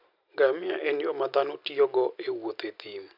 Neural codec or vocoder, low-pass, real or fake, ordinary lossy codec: none; 5.4 kHz; real; none